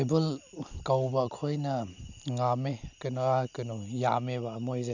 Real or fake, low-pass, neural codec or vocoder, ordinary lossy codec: real; 7.2 kHz; none; none